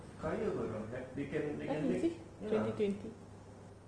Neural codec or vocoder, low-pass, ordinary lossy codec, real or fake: none; 9.9 kHz; Opus, 24 kbps; real